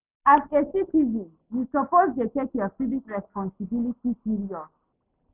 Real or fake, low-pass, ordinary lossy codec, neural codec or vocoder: real; 3.6 kHz; none; none